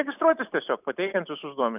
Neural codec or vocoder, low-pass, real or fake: none; 3.6 kHz; real